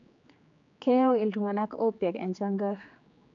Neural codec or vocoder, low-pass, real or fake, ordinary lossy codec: codec, 16 kHz, 4 kbps, X-Codec, HuBERT features, trained on general audio; 7.2 kHz; fake; none